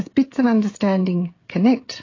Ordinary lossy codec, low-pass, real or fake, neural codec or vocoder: AAC, 32 kbps; 7.2 kHz; fake; codec, 16 kHz, 16 kbps, FreqCodec, smaller model